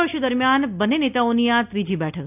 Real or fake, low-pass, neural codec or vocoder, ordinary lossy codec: real; 3.6 kHz; none; none